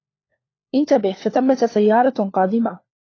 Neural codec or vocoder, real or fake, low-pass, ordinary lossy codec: codec, 16 kHz, 4 kbps, FunCodec, trained on LibriTTS, 50 frames a second; fake; 7.2 kHz; AAC, 32 kbps